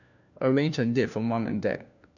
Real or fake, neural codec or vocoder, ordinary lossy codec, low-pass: fake; codec, 16 kHz, 1 kbps, FunCodec, trained on LibriTTS, 50 frames a second; none; 7.2 kHz